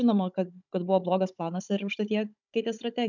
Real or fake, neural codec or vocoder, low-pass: fake; codec, 16 kHz, 16 kbps, FunCodec, trained on Chinese and English, 50 frames a second; 7.2 kHz